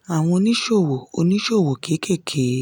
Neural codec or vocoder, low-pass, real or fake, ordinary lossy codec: none; 19.8 kHz; real; none